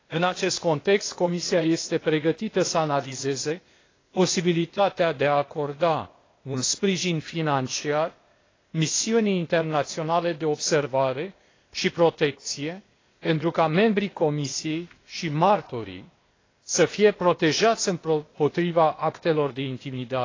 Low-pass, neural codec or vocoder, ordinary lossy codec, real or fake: 7.2 kHz; codec, 16 kHz, 0.8 kbps, ZipCodec; AAC, 32 kbps; fake